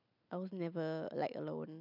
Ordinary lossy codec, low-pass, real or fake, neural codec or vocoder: none; 5.4 kHz; real; none